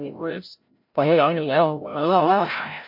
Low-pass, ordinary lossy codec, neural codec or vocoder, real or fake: 5.4 kHz; MP3, 32 kbps; codec, 16 kHz, 0.5 kbps, FreqCodec, larger model; fake